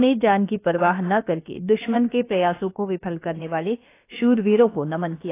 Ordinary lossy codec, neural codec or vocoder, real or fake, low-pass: AAC, 24 kbps; codec, 16 kHz, about 1 kbps, DyCAST, with the encoder's durations; fake; 3.6 kHz